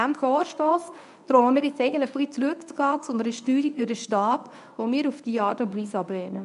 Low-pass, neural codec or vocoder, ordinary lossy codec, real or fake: 10.8 kHz; codec, 24 kHz, 0.9 kbps, WavTokenizer, medium speech release version 1; none; fake